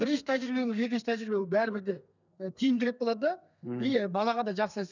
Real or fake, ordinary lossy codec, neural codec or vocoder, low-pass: fake; none; codec, 32 kHz, 1.9 kbps, SNAC; 7.2 kHz